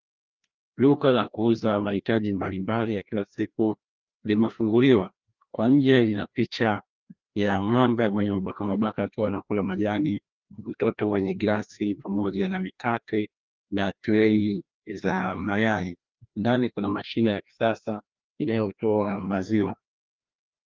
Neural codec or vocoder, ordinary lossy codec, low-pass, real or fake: codec, 16 kHz, 1 kbps, FreqCodec, larger model; Opus, 24 kbps; 7.2 kHz; fake